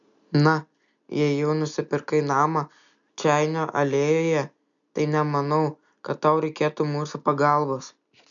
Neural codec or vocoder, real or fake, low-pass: none; real; 7.2 kHz